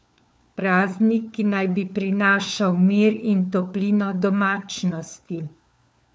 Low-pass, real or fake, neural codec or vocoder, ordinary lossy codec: none; fake; codec, 16 kHz, 4 kbps, FunCodec, trained on LibriTTS, 50 frames a second; none